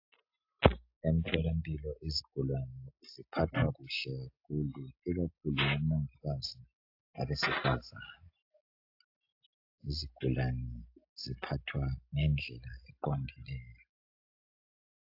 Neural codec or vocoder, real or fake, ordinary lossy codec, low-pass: none; real; AAC, 48 kbps; 5.4 kHz